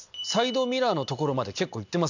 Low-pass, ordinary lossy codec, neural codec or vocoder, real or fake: 7.2 kHz; none; none; real